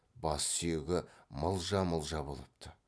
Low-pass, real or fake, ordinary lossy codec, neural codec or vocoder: none; real; none; none